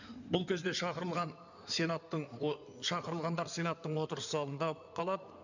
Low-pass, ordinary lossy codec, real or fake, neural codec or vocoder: 7.2 kHz; none; fake; codec, 16 kHz in and 24 kHz out, 2.2 kbps, FireRedTTS-2 codec